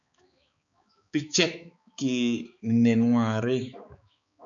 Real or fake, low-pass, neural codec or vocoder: fake; 7.2 kHz; codec, 16 kHz, 4 kbps, X-Codec, HuBERT features, trained on balanced general audio